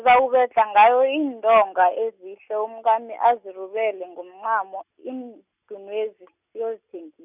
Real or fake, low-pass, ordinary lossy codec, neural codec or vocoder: real; 3.6 kHz; none; none